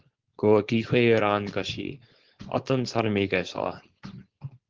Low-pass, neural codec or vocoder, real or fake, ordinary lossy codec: 7.2 kHz; codec, 16 kHz, 4.8 kbps, FACodec; fake; Opus, 16 kbps